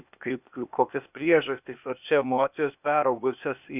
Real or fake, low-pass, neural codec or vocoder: fake; 3.6 kHz; codec, 16 kHz, 0.8 kbps, ZipCodec